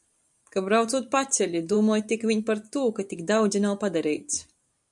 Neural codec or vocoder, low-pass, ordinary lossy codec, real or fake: vocoder, 44.1 kHz, 128 mel bands every 512 samples, BigVGAN v2; 10.8 kHz; MP3, 96 kbps; fake